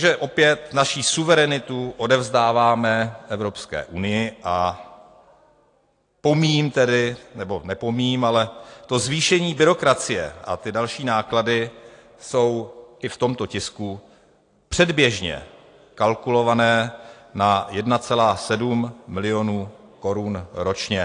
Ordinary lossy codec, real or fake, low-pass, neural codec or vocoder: AAC, 48 kbps; real; 9.9 kHz; none